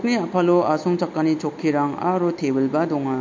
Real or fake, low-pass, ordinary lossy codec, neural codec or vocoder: real; 7.2 kHz; MP3, 48 kbps; none